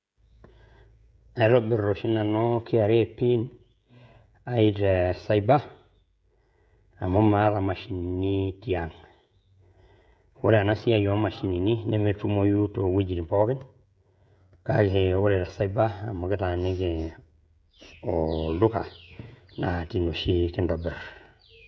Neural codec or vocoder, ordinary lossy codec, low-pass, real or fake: codec, 16 kHz, 16 kbps, FreqCodec, smaller model; none; none; fake